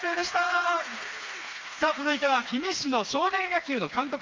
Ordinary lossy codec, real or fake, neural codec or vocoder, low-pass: Opus, 32 kbps; fake; codec, 16 kHz, 2 kbps, FreqCodec, smaller model; 7.2 kHz